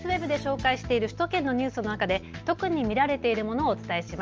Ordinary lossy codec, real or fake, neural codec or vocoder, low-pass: Opus, 24 kbps; real; none; 7.2 kHz